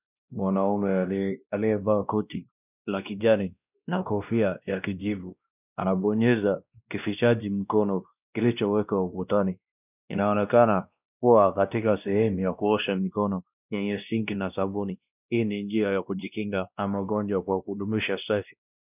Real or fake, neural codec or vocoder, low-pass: fake; codec, 16 kHz, 1 kbps, X-Codec, WavLM features, trained on Multilingual LibriSpeech; 3.6 kHz